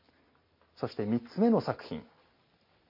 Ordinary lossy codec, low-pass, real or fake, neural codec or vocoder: MP3, 32 kbps; 5.4 kHz; real; none